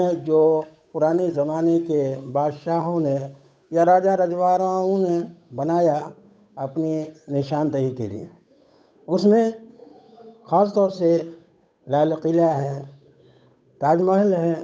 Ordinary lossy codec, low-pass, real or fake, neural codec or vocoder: none; none; fake; codec, 16 kHz, 8 kbps, FunCodec, trained on Chinese and English, 25 frames a second